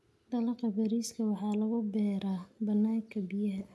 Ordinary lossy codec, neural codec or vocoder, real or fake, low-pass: none; none; real; none